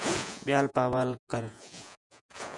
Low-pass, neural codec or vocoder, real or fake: 10.8 kHz; vocoder, 48 kHz, 128 mel bands, Vocos; fake